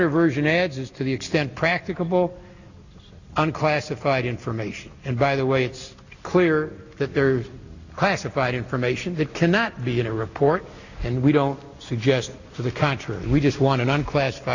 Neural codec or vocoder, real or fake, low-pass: none; real; 7.2 kHz